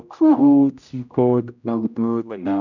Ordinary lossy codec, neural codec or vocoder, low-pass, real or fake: none; codec, 16 kHz, 0.5 kbps, X-Codec, HuBERT features, trained on general audio; 7.2 kHz; fake